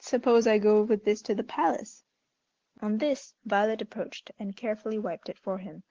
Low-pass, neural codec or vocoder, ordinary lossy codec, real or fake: 7.2 kHz; none; Opus, 16 kbps; real